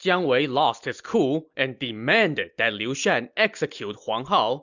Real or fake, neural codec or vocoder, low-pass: real; none; 7.2 kHz